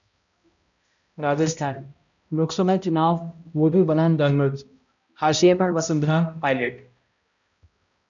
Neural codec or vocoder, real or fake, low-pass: codec, 16 kHz, 0.5 kbps, X-Codec, HuBERT features, trained on balanced general audio; fake; 7.2 kHz